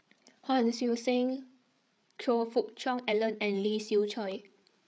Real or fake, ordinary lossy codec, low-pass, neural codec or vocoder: fake; none; none; codec, 16 kHz, 8 kbps, FreqCodec, larger model